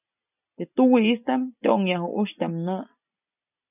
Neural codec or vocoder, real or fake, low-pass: none; real; 3.6 kHz